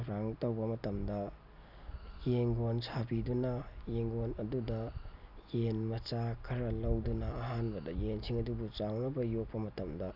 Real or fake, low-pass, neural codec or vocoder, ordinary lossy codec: real; 5.4 kHz; none; none